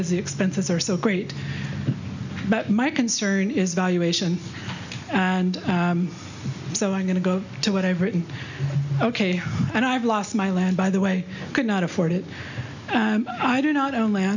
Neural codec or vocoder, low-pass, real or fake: none; 7.2 kHz; real